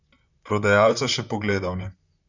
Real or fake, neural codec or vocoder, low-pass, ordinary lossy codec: fake; vocoder, 44.1 kHz, 128 mel bands, Pupu-Vocoder; 7.2 kHz; none